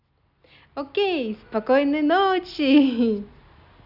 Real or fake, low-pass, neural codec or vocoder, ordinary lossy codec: real; 5.4 kHz; none; none